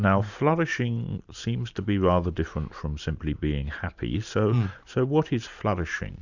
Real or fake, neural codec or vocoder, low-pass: fake; vocoder, 44.1 kHz, 128 mel bands every 512 samples, BigVGAN v2; 7.2 kHz